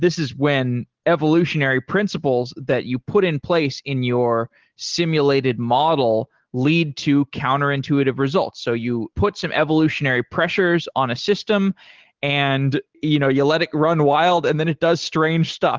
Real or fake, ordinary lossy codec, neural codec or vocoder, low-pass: real; Opus, 16 kbps; none; 7.2 kHz